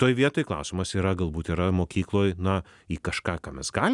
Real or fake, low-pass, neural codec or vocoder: real; 10.8 kHz; none